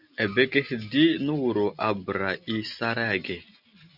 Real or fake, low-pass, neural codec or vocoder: real; 5.4 kHz; none